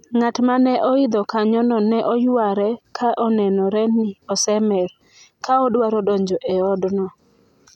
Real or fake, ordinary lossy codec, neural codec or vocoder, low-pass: real; none; none; 19.8 kHz